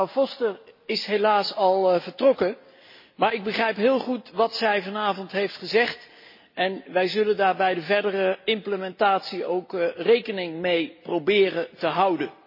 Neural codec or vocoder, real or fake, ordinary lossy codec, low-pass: none; real; MP3, 24 kbps; 5.4 kHz